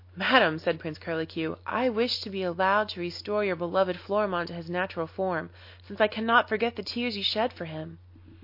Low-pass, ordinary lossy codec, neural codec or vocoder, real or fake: 5.4 kHz; MP3, 32 kbps; none; real